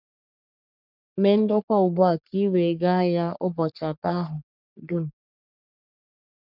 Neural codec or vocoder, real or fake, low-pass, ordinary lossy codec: codec, 44.1 kHz, 3.4 kbps, Pupu-Codec; fake; 5.4 kHz; none